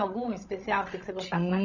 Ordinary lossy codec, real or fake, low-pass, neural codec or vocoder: none; fake; 7.2 kHz; codec, 16 kHz, 16 kbps, FreqCodec, larger model